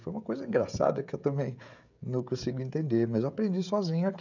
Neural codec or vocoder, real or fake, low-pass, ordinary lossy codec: codec, 16 kHz, 16 kbps, FreqCodec, smaller model; fake; 7.2 kHz; none